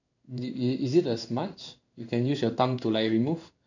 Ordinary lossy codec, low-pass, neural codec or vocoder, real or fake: none; 7.2 kHz; codec, 16 kHz in and 24 kHz out, 1 kbps, XY-Tokenizer; fake